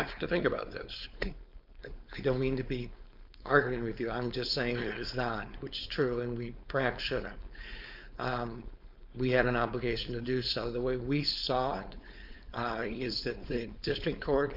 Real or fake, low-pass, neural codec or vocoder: fake; 5.4 kHz; codec, 16 kHz, 4.8 kbps, FACodec